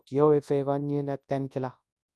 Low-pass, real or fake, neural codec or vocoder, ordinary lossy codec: none; fake; codec, 24 kHz, 0.9 kbps, WavTokenizer, large speech release; none